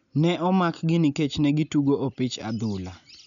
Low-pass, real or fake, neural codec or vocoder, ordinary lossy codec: 7.2 kHz; real; none; none